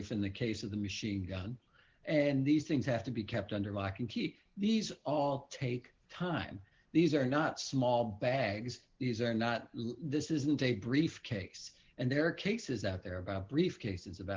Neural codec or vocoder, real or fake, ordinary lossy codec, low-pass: none; real; Opus, 16 kbps; 7.2 kHz